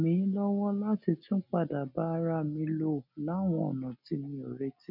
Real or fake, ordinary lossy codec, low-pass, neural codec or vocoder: real; none; 5.4 kHz; none